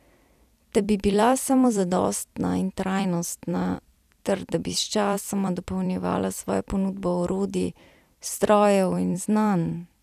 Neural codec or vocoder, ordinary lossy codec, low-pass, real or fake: vocoder, 44.1 kHz, 128 mel bands every 256 samples, BigVGAN v2; none; 14.4 kHz; fake